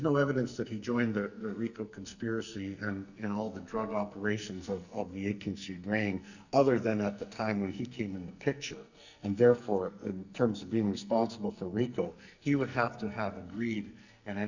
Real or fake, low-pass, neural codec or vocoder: fake; 7.2 kHz; codec, 44.1 kHz, 2.6 kbps, SNAC